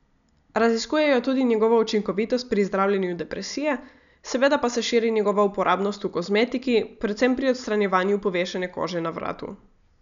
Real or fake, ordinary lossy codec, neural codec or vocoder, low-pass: real; none; none; 7.2 kHz